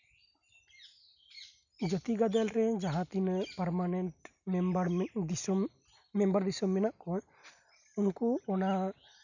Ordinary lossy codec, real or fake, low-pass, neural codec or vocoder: none; real; 7.2 kHz; none